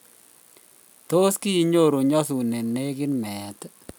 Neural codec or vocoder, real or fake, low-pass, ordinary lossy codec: none; real; none; none